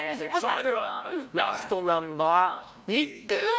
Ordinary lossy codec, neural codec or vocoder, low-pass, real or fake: none; codec, 16 kHz, 0.5 kbps, FreqCodec, larger model; none; fake